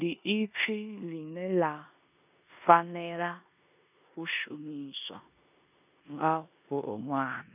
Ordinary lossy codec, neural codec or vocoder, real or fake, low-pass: none; codec, 16 kHz in and 24 kHz out, 0.9 kbps, LongCat-Audio-Codec, four codebook decoder; fake; 3.6 kHz